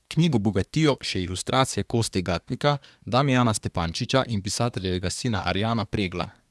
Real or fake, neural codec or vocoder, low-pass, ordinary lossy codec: fake; codec, 24 kHz, 1 kbps, SNAC; none; none